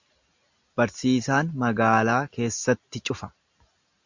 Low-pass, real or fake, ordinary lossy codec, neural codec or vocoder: 7.2 kHz; real; Opus, 64 kbps; none